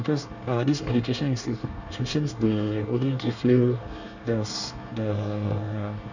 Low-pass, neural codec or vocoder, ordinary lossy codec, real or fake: 7.2 kHz; codec, 24 kHz, 1 kbps, SNAC; none; fake